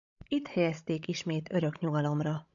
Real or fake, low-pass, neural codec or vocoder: fake; 7.2 kHz; codec, 16 kHz, 16 kbps, FreqCodec, larger model